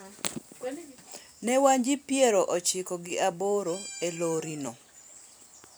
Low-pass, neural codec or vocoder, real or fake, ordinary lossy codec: none; vocoder, 44.1 kHz, 128 mel bands every 256 samples, BigVGAN v2; fake; none